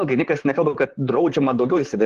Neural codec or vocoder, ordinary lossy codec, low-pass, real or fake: vocoder, 44.1 kHz, 128 mel bands, Pupu-Vocoder; Opus, 16 kbps; 14.4 kHz; fake